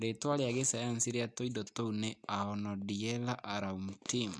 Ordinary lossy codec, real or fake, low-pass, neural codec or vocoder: none; real; none; none